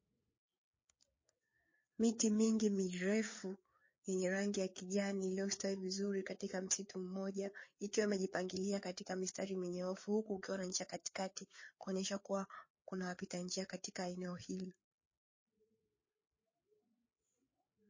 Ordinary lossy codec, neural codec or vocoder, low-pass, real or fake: MP3, 32 kbps; codec, 16 kHz, 4 kbps, FreqCodec, larger model; 7.2 kHz; fake